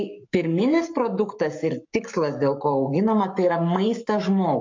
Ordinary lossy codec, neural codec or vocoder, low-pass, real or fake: MP3, 64 kbps; codec, 44.1 kHz, 7.8 kbps, Pupu-Codec; 7.2 kHz; fake